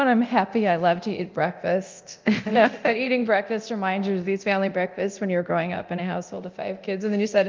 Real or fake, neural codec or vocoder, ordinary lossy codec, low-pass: fake; codec, 24 kHz, 0.9 kbps, DualCodec; Opus, 24 kbps; 7.2 kHz